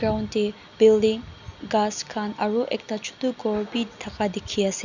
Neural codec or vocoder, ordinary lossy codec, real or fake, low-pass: none; none; real; 7.2 kHz